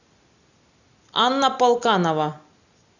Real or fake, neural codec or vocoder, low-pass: real; none; 7.2 kHz